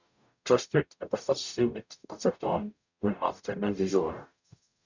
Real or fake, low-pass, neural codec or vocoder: fake; 7.2 kHz; codec, 44.1 kHz, 0.9 kbps, DAC